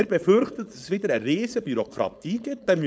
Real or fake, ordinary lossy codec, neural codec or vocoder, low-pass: fake; none; codec, 16 kHz, 4.8 kbps, FACodec; none